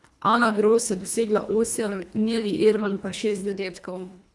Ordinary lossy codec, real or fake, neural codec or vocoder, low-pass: none; fake; codec, 24 kHz, 1.5 kbps, HILCodec; none